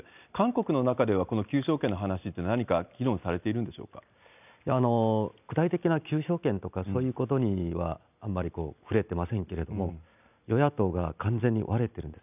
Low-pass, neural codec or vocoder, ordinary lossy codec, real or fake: 3.6 kHz; none; none; real